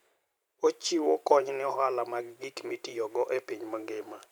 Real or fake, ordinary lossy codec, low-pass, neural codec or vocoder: fake; none; none; vocoder, 44.1 kHz, 128 mel bands every 512 samples, BigVGAN v2